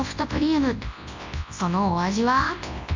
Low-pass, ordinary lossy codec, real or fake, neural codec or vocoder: 7.2 kHz; MP3, 64 kbps; fake; codec, 24 kHz, 0.9 kbps, WavTokenizer, large speech release